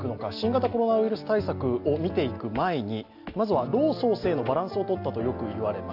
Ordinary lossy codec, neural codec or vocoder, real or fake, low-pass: AAC, 48 kbps; none; real; 5.4 kHz